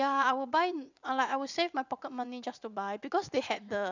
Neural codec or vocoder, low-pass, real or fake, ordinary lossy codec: vocoder, 44.1 kHz, 80 mel bands, Vocos; 7.2 kHz; fake; none